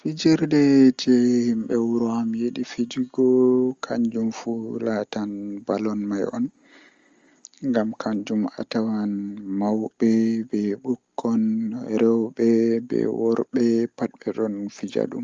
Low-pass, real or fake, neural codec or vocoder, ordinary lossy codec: 7.2 kHz; real; none; Opus, 32 kbps